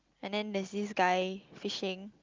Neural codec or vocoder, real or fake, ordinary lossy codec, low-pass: none; real; Opus, 24 kbps; 7.2 kHz